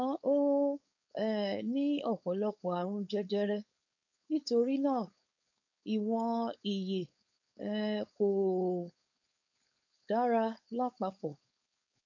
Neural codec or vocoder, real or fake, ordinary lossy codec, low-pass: codec, 16 kHz, 4.8 kbps, FACodec; fake; none; 7.2 kHz